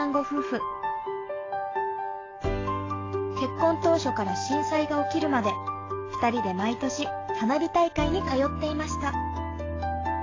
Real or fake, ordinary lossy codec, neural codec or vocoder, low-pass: fake; AAC, 32 kbps; codec, 44.1 kHz, 7.8 kbps, DAC; 7.2 kHz